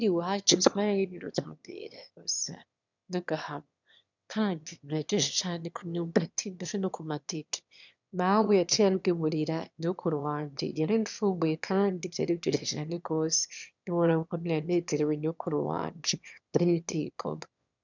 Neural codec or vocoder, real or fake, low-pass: autoencoder, 22.05 kHz, a latent of 192 numbers a frame, VITS, trained on one speaker; fake; 7.2 kHz